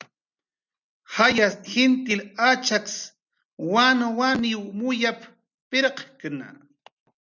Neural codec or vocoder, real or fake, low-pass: none; real; 7.2 kHz